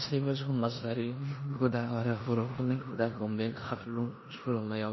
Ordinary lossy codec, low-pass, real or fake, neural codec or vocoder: MP3, 24 kbps; 7.2 kHz; fake; codec, 16 kHz in and 24 kHz out, 0.9 kbps, LongCat-Audio-Codec, four codebook decoder